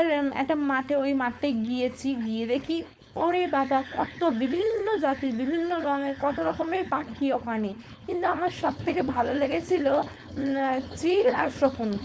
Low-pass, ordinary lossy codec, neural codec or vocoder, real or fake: none; none; codec, 16 kHz, 4.8 kbps, FACodec; fake